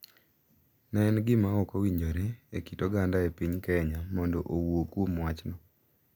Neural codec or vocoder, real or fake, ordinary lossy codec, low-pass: none; real; none; none